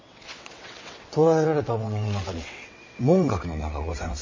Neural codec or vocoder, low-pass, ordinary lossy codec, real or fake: vocoder, 22.05 kHz, 80 mel bands, WaveNeXt; 7.2 kHz; MP3, 32 kbps; fake